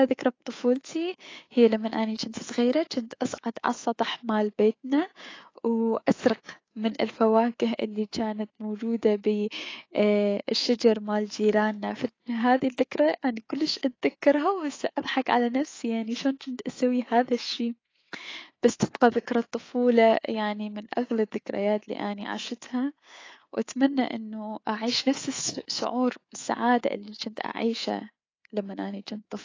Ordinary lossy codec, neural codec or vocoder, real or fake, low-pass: AAC, 32 kbps; codec, 24 kHz, 3.1 kbps, DualCodec; fake; 7.2 kHz